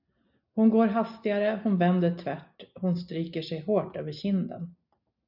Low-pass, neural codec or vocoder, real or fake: 5.4 kHz; none; real